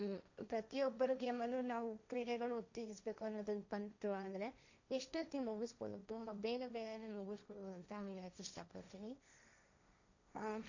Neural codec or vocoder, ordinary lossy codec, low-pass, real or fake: codec, 16 kHz, 1.1 kbps, Voila-Tokenizer; none; 7.2 kHz; fake